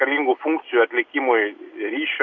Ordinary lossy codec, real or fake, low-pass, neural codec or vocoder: Opus, 64 kbps; real; 7.2 kHz; none